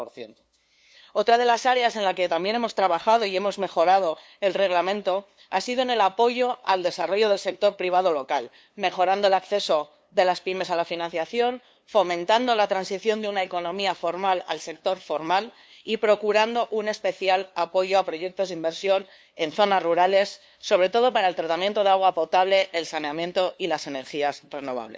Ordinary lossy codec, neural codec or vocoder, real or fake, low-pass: none; codec, 16 kHz, 2 kbps, FunCodec, trained on LibriTTS, 25 frames a second; fake; none